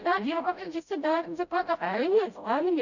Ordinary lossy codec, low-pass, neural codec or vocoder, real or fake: none; 7.2 kHz; codec, 16 kHz, 0.5 kbps, FreqCodec, smaller model; fake